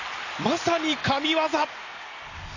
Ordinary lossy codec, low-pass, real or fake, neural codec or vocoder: none; 7.2 kHz; real; none